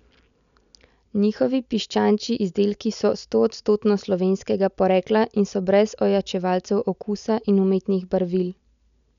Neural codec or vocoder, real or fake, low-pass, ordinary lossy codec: none; real; 7.2 kHz; none